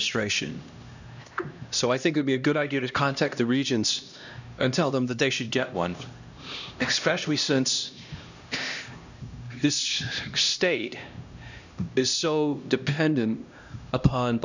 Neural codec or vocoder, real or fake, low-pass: codec, 16 kHz, 1 kbps, X-Codec, HuBERT features, trained on LibriSpeech; fake; 7.2 kHz